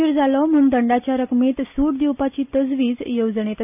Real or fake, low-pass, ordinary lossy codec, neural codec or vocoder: real; 3.6 kHz; none; none